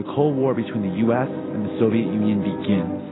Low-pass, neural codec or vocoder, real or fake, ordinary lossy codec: 7.2 kHz; none; real; AAC, 16 kbps